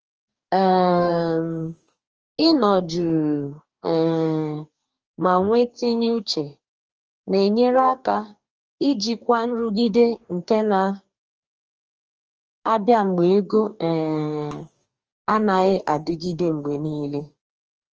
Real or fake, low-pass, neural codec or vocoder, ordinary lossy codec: fake; 7.2 kHz; codec, 44.1 kHz, 2.6 kbps, DAC; Opus, 24 kbps